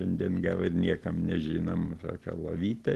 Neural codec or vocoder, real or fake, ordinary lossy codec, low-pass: none; real; Opus, 16 kbps; 14.4 kHz